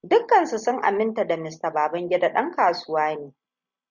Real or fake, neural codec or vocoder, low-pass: real; none; 7.2 kHz